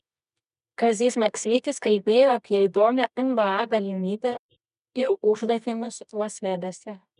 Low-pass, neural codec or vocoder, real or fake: 10.8 kHz; codec, 24 kHz, 0.9 kbps, WavTokenizer, medium music audio release; fake